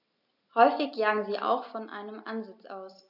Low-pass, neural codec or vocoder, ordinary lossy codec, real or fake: 5.4 kHz; none; none; real